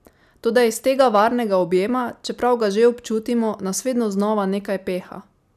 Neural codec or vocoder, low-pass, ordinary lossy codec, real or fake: none; 14.4 kHz; none; real